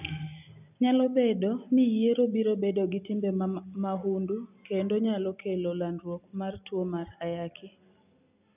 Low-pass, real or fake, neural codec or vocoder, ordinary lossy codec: 3.6 kHz; real; none; none